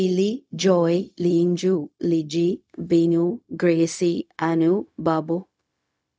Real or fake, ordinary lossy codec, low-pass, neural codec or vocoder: fake; none; none; codec, 16 kHz, 0.4 kbps, LongCat-Audio-Codec